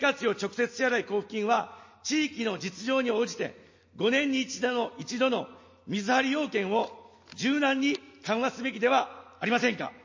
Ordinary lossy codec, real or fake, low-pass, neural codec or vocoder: MP3, 32 kbps; real; 7.2 kHz; none